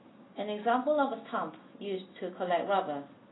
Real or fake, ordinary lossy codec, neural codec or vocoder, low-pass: real; AAC, 16 kbps; none; 7.2 kHz